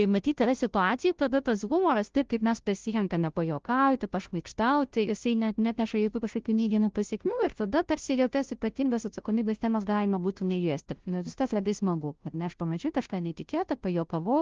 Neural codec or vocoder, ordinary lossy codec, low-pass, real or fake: codec, 16 kHz, 0.5 kbps, FunCodec, trained on Chinese and English, 25 frames a second; Opus, 16 kbps; 7.2 kHz; fake